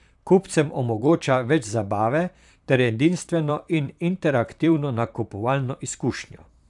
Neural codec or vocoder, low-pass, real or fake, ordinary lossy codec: vocoder, 44.1 kHz, 128 mel bands, Pupu-Vocoder; 10.8 kHz; fake; none